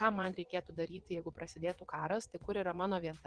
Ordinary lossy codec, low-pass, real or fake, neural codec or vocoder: Opus, 16 kbps; 9.9 kHz; fake; vocoder, 22.05 kHz, 80 mel bands, Vocos